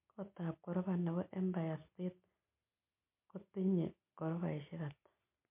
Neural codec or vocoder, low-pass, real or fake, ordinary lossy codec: none; 3.6 kHz; real; MP3, 32 kbps